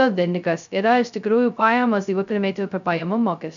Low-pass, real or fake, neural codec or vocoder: 7.2 kHz; fake; codec, 16 kHz, 0.2 kbps, FocalCodec